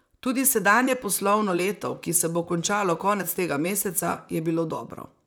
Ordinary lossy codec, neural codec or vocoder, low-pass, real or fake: none; vocoder, 44.1 kHz, 128 mel bands, Pupu-Vocoder; none; fake